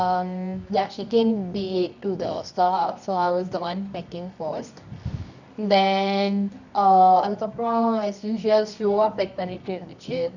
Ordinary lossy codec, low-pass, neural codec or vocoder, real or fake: none; 7.2 kHz; codec, 24 kHz, 0.9 kbps, WavTokenizer, medium music audio release; fake